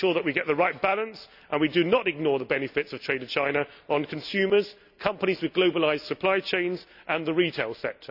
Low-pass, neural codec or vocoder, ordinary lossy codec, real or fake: 5.4 kHz; none; none; real